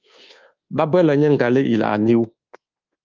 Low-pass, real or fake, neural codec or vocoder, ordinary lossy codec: 7.2 kHz; fake; codec, 24 kHz, 1.2 kbps, DualCodec; Opus, 32 kbps